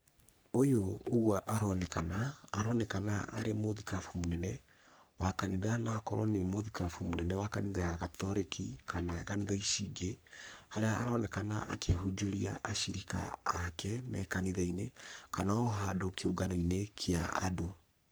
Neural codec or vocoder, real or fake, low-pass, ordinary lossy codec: codec, 44.1 kHz, 3.4 kbps, Pupu-Codec; fake; none; none